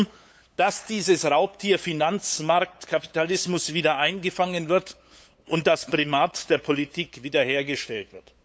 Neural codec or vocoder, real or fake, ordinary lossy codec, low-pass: codec, 16 kHz, 8 kbps, FunCodec, trained on LibriTTS, 25 frames a second; fake; none; none